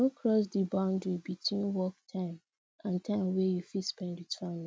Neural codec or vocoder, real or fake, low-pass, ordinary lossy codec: none; real; none; none